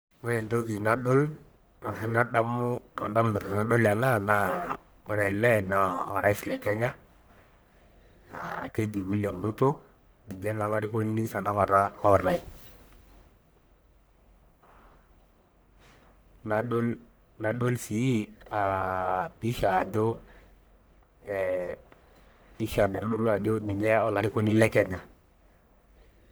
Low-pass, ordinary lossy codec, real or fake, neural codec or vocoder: none; none; fake; codec, 44.1 kHz, 1.7 kbps, Pupu-Codec